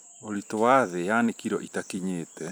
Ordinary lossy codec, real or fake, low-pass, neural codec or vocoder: none; fake; none; vocoder, 44.1 kHz, 128 mel bands every 256 samples, BigVGAN v2